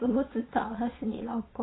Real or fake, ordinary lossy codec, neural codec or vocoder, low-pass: fake; AAC, 16 kbps; vocoder, 22.05 kHz, 80 mel bands, Vocos; 7.2 kHz